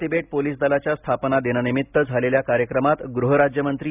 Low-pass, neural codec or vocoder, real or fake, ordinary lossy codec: 3.6 kHz; none; real; none